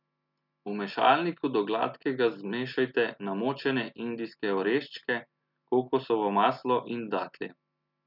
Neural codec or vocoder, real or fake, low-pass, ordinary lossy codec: none; real; 5.4 kHz; none